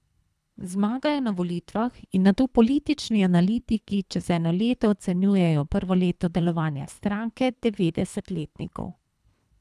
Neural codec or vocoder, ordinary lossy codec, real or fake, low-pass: codec, 24 kHz, 3 kbps, HILCodec; none; fake; none